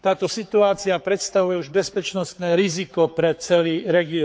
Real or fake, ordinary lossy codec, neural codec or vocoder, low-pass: fake; none; codec, 16 kHz, 4 kbps, X-Codec, HuBERT features, trained on general audio; none